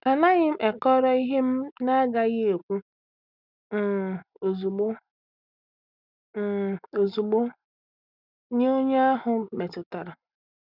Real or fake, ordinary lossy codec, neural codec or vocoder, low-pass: real; none; none; 5.4 kHz